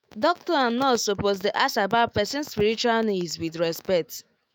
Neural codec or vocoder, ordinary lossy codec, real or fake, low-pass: autoencoder, 48 kHz, 128 numbers a frame, DAC-VAE, trained on Japanese speech; none; fake; none